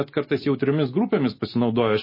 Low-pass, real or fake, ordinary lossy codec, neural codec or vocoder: 5.4 kHz; real; MP3, 24 kbps; none